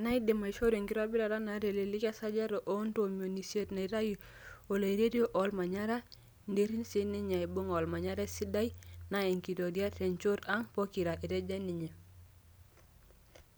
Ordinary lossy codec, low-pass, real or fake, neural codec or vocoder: none; none; real; none